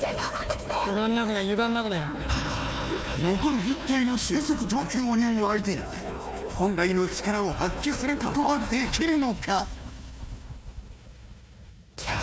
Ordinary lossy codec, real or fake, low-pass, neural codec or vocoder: none; fake; none; codec, 16 kHz, 1 kbps, FunCodec, trained on Chinese and English, 50 frames a second